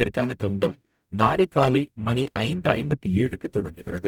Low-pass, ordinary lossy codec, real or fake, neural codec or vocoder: 19.8 kHz; none; fake; codec, 44.1 kHz, 0.9 kbps, DAC